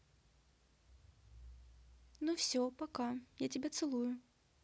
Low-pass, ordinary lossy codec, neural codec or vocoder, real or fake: none; none; none; real